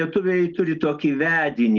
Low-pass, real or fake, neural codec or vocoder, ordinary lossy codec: 7.2 kHz; real; none; Opus, 16 kbps